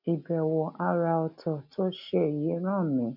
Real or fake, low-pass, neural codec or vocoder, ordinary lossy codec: real; 5.4 kHz; none; none